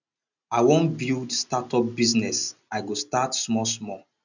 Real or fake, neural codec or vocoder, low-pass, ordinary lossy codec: real; none; 7.2 kHz; none